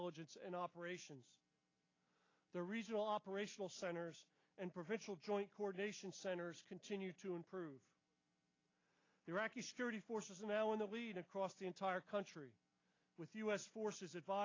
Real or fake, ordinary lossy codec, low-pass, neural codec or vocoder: real; AAC, 32 kbps; 7.2 kHz; none